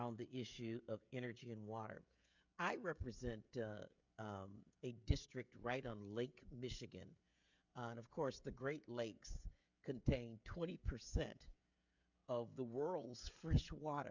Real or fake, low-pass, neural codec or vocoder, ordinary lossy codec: fake; 7.2 kHz; codec, 16 kHz, 16 kbps, FreqCodec, smaller model; MP3, 64 kbps